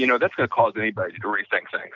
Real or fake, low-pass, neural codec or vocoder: real; 7.2 kHz; none